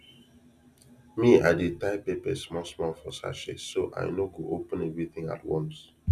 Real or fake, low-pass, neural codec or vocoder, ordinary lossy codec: real; 14.4 kHz; none; none